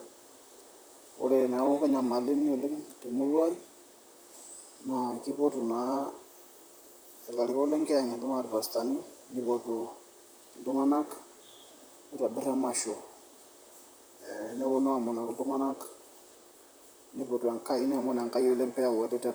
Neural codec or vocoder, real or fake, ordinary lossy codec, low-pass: vocoder, 44.1 kHz, 128 mel bands, Pupu-Vocoder; fake; none; none